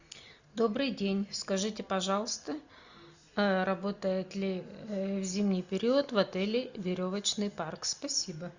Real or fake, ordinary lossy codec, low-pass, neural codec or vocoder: real; Opus, 64 kbps; 7.2 kHz; none